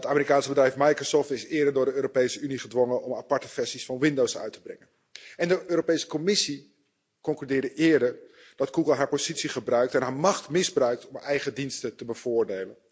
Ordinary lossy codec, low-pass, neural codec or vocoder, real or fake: none; none; none; real